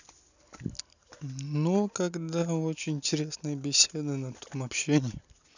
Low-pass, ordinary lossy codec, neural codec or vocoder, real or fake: 7.2 kHz; none; none; real